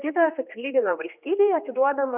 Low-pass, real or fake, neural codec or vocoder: 3.6 kHz; fake; codec, 16 kHz, 2 kbps, X-Codec, HuBERT features, trained on general audio